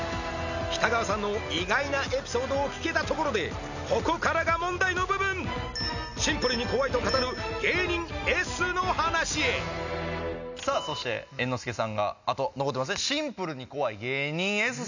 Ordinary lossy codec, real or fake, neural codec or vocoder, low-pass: none; real; none; 7.2 kHz